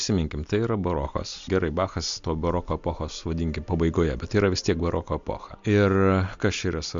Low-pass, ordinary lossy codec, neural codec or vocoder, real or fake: 7.2 kHz; MP3, 64 kbps; none; real